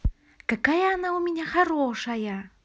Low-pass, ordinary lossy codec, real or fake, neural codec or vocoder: none; none; real; none